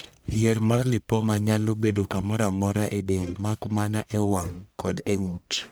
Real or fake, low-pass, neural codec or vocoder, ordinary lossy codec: fake; none; codec, 44.1 kHz, 1.7 kbps, Pupu-Codec; none